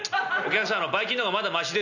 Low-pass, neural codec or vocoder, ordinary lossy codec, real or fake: 7.2 kHz; none; none; real